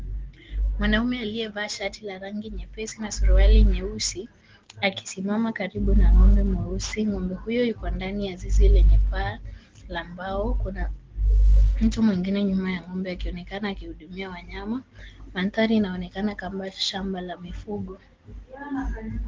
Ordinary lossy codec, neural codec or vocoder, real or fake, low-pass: Opus, 16 kbps; none; real; 7.2 kHz